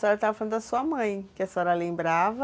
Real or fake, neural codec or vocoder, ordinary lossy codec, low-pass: real; none; none; none